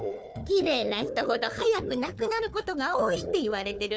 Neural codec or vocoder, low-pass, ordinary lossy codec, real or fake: codec, 16 kHz, 4 kbps, FunCodec, trained on Chinese and English, 50 frames a second; none; none; fake